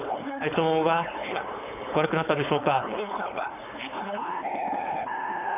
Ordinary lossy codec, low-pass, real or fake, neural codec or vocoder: none; 3.6 kHz; fake; codec, 16 kHz, 4.8 kbps, FACodec